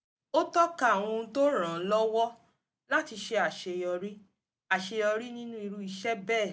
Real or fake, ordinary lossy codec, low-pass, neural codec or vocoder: real; none; none; none